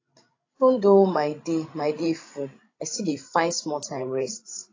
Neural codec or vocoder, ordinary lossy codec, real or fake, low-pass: codec, 16 kHz, 16 kbps, FreqCodec, larger model; AAC, 32 kbps; fake; 7.2 kHz